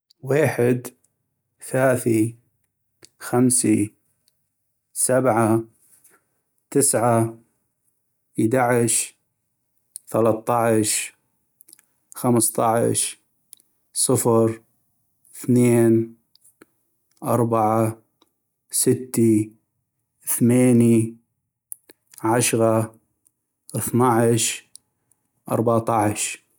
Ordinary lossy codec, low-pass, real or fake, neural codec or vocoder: none; none; real; none